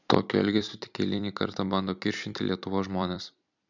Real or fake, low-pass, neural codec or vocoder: fake; 7.2 kHz; vocoder, 44.1 kHz, 128 mel bands every 256 samples, BigVGAN v2